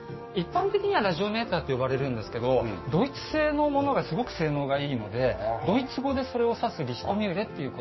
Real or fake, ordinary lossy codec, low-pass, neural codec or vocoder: fake; MP3, 24 kbps; 7.2 kHz; codec, 16 kHz, 6 kbps, DAC